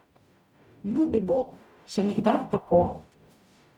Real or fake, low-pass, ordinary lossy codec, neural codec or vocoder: fake; 19.8 kHz; none; codec, 44.1 kHz, 0.9 kbps, DAC